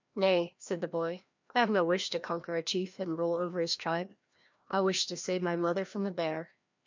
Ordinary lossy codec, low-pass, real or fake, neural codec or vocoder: MP3, 64 kbps; 7.2 kHz; fake; codec, 16 kHz, 1 kbps, FreqCodec, larger model